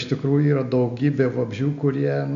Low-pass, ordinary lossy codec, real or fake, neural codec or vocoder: 7.2 kHz; MP3, 64 kbps; real; none